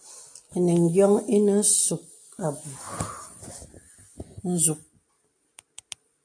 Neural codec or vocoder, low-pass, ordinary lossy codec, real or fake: none; 9.9 kHz; AAC, 64 kbps; real